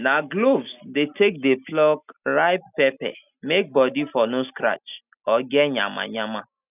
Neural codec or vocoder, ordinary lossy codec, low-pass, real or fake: none; none; 3.6 kHz; real